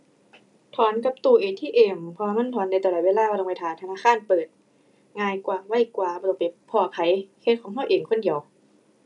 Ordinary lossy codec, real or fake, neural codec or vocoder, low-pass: none; real; none; 10.8 kHz